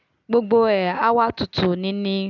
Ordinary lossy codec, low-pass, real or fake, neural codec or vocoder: none; 7.2 kHz; real; none